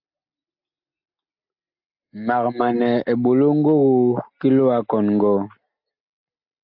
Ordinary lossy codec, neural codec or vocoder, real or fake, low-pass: AAC, 48 kbps; none; real; 5.4 kHz